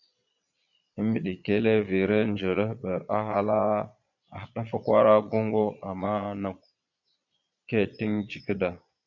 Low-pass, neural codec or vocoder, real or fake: 7.2 kHz; vocoder, 22.05 kHz, 80 mel bands, Vocos; fake